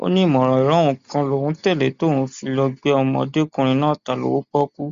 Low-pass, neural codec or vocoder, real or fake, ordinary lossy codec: 7.2 kHz; none; real; Opus, 64 kbps